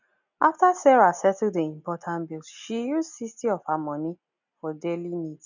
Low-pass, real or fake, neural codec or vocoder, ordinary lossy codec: 7.2 kHz; real; none; none